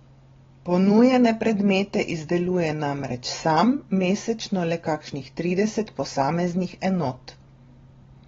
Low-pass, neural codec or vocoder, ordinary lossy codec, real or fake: 7.2 kHz; none; AAC, 24 kbps; real